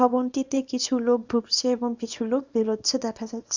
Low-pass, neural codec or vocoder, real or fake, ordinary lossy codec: 7.2 kHz; codec, 24 kHz, 0.9 kbps, WavTokenizer, small release; fake; Opus, 64 kbps